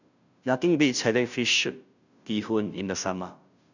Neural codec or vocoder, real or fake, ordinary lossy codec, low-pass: codec, 16 kHz, 0.5 kbps, FunCodec, trained on Chinese and English, 25 frames a second; fake; none; 7.2 kHz